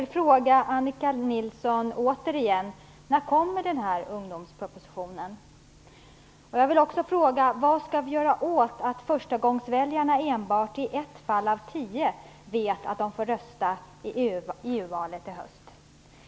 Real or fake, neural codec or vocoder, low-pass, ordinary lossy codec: real; none; none; none